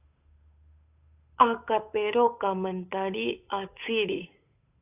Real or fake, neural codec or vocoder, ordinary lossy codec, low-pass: fake; codec, 16 kHz, 8 kbps, FunCodec, trained on Chinese and English, 25 frames a second; AAC, 32 kbps; 3.6 kHz